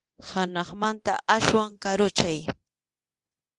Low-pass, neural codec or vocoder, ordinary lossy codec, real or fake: 10.8 kHz; codec, 24 kHz, 0.9 kbps, DualCodec; Opus, 24 kbps; fake